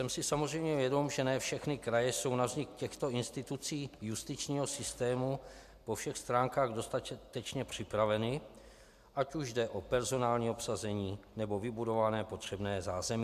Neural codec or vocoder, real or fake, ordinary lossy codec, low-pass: none; real; MP3, 96 kbps; 14.4 kHz